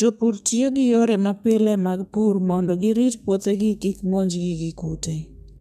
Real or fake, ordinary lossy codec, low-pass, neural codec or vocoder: fake; none; 14.4 kHz; codec, 32 kHz, 1.9 kbps, SNAC